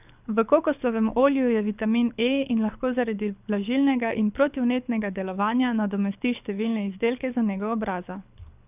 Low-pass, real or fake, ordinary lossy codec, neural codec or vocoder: 3.6 kHz; fake; none; codec, 24 kHz, 6 kbps, HILCodec